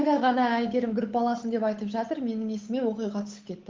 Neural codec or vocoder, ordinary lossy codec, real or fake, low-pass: codec, 16 kHz, 8 kbps, FunCodec, trained on Chinese and English, 25 frames a second; Opus, 24 kbps; fake; 7.2 kHz